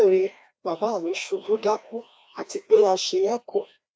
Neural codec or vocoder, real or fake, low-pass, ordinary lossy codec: codec, 16 kHz, 1 kbps, FreqCodec, larger model; fake; none; none